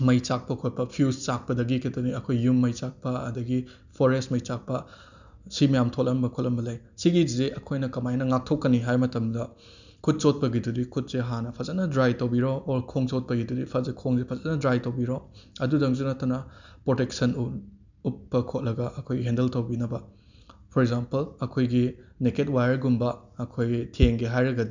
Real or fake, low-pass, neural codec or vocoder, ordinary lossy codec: real; 7.2 kHz; none; none